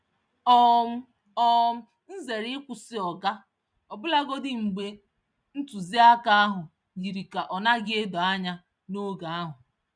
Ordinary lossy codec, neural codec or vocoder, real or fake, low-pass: none; none; real; 9.9 kHz